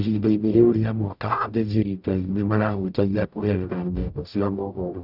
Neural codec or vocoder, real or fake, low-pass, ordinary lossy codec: codec, 44.1 kHz, 0.9 kbps, DAC; fake; 5.4 kHz; none